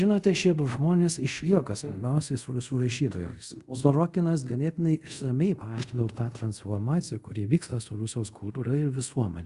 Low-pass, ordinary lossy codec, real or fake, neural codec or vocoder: 10.8 kHz; Opus, 64 kbps; fake; codec, 24 kHz, 0.5 kbps, DualCodec